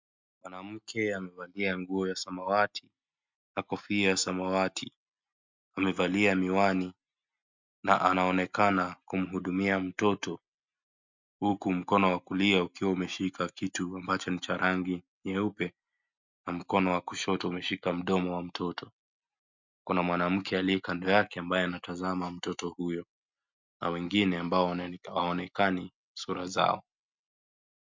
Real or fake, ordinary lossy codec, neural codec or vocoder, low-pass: real; MP3, 64 kbps; none; 7.2 kHz